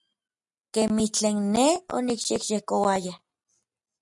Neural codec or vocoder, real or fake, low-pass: none; real; 10.8 kHz